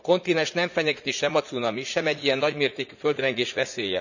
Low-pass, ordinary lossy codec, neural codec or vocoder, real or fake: 7.2 kHz; none; vocoder, 44.1 kHz, 80 mel bands, Vocos; fake